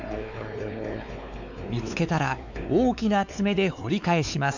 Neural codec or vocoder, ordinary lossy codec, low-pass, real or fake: codec, 16 kHz, 4 kbps, X-Codec, WavLM features, trained on Multilingual LibriSpeech; none; 7.2 kHz; fake